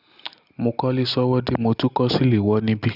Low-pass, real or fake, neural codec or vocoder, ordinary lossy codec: 5.4 kHz; real; none; none